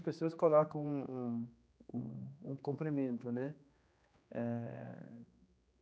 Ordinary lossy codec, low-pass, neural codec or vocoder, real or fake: none; none; codec, 16 kHz, 2 kbps, X-Codec, HuBERT features, trained on general audio; fake